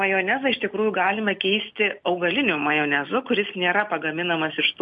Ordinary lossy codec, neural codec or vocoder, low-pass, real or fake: MP3, 48 kbps; none; 10.8 kHz; real